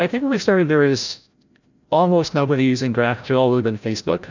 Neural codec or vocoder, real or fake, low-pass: codec, 16 kHz, 0.5 kbps, FreqCodec, larger model; fake; 7.2 kHz